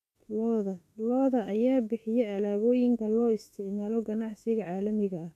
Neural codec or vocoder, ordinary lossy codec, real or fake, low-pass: autoencoder, 48 kHz, 32 numbers a frame, DAC-VAE, trained on Japanese speech; none; fake; 14.4 kHz